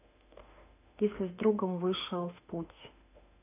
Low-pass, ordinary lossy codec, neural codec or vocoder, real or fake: 3.6 kHz; none; autoencoder, 48 kHz, 32 numbers a frame, DAC-VAE, trained on Japanese speech; fake